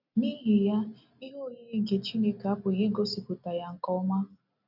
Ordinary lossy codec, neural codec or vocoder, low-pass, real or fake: none; none; 5.4 kHz; real